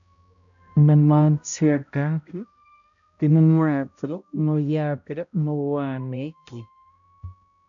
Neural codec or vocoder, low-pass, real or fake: codec, 16 kHz, 0.5 kbps, X-Codec, HuBERT features, trained on balanced general audio; 7.2 kHz; fake